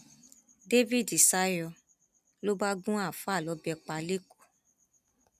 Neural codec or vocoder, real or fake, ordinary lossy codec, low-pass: none; real; none; 14.4 kHz